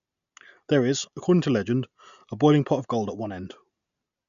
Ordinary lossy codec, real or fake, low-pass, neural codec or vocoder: none; real; 7.2 kHz; none